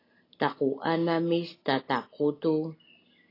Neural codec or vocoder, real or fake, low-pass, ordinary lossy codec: none; real; 5.4 kHz; AAC, 24 kbps